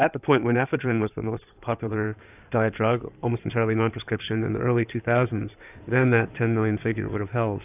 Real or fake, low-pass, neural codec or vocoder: fake; 3.6 kHz; codec, 16 kHz in and 24 kHz out, 2.2 kbps, FireRedTTS-2 codec